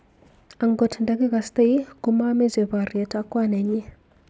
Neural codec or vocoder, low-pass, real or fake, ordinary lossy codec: none; none; real; none